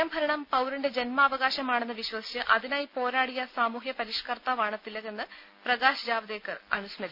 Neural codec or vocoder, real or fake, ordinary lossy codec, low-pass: none; real; none; 5.4 kHz